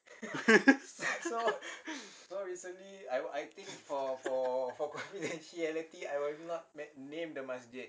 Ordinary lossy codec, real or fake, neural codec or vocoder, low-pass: none; real; none; none